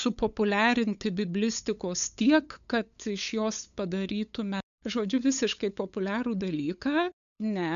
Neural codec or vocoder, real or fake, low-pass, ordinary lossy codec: codec, 16 kHz, 8 kbps, FunCodec, trained on LibriTTS, 25 frames a second; fake; 7.2 kHz; MP3, 96 kbps